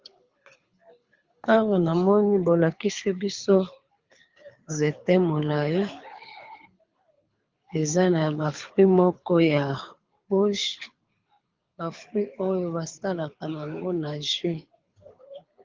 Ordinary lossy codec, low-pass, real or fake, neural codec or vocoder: Opus, 32 kbps; 7.2 kHz; fake; codec, 24 kHz, 6 kbps, HILCodec